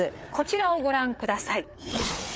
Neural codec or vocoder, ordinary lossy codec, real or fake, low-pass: codec, 16 kHz, 4 kbps, FreqCodec, larger model; none; fake; none